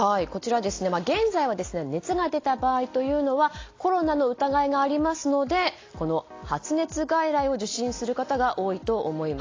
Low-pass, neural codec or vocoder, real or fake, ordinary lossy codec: 7.2 kHz; none; real; AAC, 32 kbps